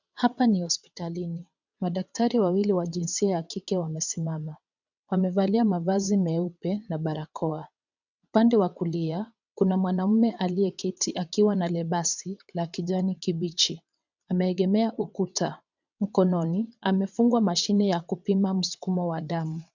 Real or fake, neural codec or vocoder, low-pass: fake; vocoder, 22.05 kHz, 80 mel bands, WaveNeXt; 7.2 kHz